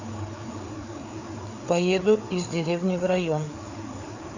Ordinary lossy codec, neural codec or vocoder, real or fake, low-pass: none; codec, 16 kHz, 4 kbps, FreqCodec, larger model; fake; 7.2 kHz